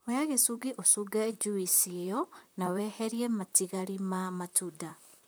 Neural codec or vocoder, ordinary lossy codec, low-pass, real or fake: vocoder, 44.1 kHz, 128 mel bands, Pupu-Vocoder; none; none; fake